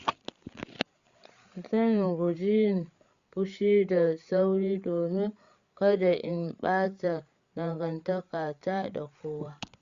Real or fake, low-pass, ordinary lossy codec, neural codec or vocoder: fake; 7.2 kHz; Opus, 64 kbps; codec, 16 kHz, 8 kbps, FreqCodec, larger model